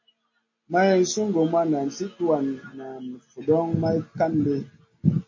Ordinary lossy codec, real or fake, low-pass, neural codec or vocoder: MP3, 32 kbps; real; 7.2 kHz; none